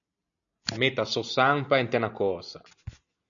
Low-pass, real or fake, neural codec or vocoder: 7.2 kHz; real; none